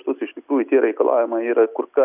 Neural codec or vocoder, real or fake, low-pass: none; real; 3.6 kHz